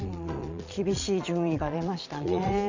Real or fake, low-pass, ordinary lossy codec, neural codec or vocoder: fake; 7.2 kHz; Opus, 64 kbps; vocoder, 22.05 kHz, 80 mel bands, Vocos